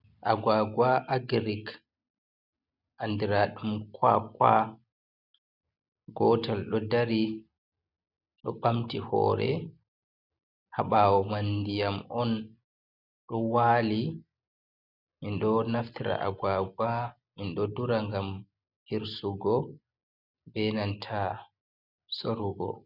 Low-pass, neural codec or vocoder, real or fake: 5.4 kHz; none; real